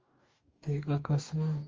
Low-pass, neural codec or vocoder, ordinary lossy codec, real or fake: 7.2 kHz; codec, 44.1 kHz, 2.6 kbps, DAC; Opus, 32 kbps; fake